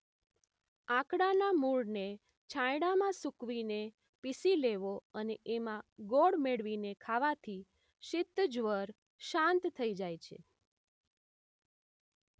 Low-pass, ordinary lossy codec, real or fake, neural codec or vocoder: none; none; real; none